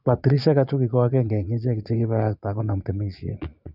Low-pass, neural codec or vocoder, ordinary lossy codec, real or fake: 5.4 kHz; vocoder, 22.05 kHz, 80 mel bands, Vocos; none; fake